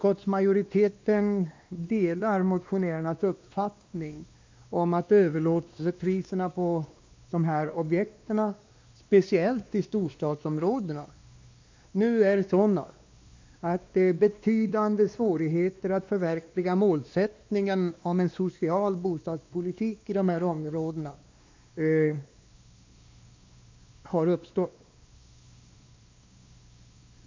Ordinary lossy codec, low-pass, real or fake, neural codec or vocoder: MP3, 64 kbps; 7.2 kHz; fake; codec, 16 kHz, 2 kbps, X-Codec, WavLM features, trained on Multilingual LibriSpeech